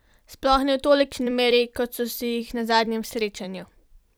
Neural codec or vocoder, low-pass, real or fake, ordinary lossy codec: vocoder, 44.1 kHz, 128 mel bands every 256 samples, BigVGAN v2; none; fake; none